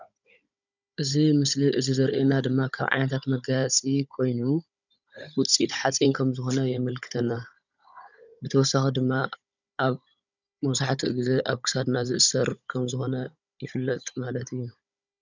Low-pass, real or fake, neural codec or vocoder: 7.2 kHz; fake; codec, 16 kHz, 16 kbps, FunCodec, trained on Chinese and English, 50 frames a second